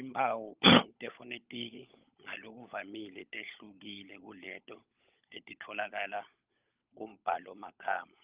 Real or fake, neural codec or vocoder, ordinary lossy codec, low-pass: fake; codec, 16 kHz, 16 kbps, FunCodec, trained on LibriTTS, 50 frames a second; Opus, 32 kbps; 3.6 kHz